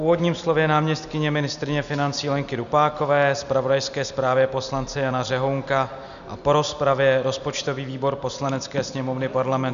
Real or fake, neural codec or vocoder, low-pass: real; none; 7.2 kHz